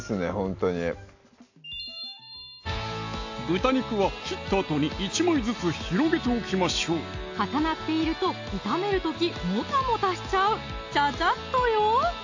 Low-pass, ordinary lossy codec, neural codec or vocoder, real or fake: 7.2 kHz; AAC, 48 kbps; none; real